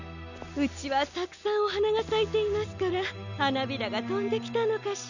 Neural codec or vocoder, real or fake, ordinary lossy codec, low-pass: none; real; none; 7.2 kHz